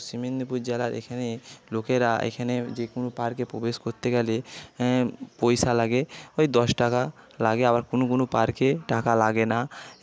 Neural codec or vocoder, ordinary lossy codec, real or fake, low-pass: none; none; real; none